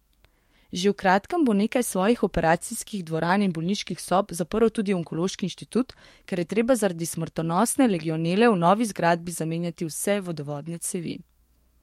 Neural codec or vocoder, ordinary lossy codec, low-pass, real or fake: codec, 44.1 kHz, 7.8 kbps, DAC; MP3, 64 kbps; 19.8 kHz; fake